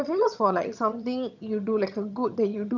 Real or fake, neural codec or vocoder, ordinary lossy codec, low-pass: fake; vocoder, 22.05 kHz, 80 mel bands, HiFi-GAN; none; 7.2 kHz